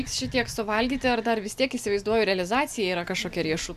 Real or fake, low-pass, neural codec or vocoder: real; 14.4 kHz; none